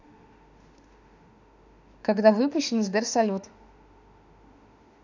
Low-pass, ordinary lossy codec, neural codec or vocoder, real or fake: 7.2 kHz; none; autoencoder, 48 kHz, 32 numbers a frame, DAC-VAE, trained on Japanese speech; fake